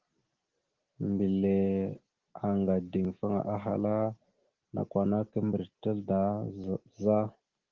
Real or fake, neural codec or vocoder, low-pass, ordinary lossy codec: real; none; 7.2 kHz; Opus, 16 kbps